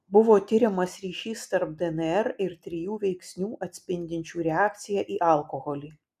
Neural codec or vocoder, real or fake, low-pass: none; real; 14.4 kHz